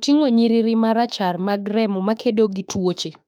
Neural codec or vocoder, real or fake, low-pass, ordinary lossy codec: autoencoder, 48 kHz, 32 numbers a frame, DAC-VAE, trained on Japanese speech; fake; 19.8 kHz; none